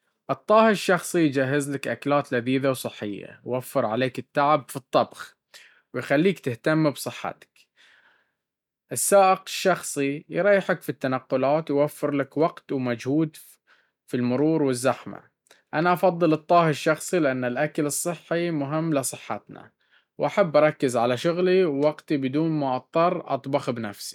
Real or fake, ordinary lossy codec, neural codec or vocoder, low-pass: real; none; none; 19.8 kHz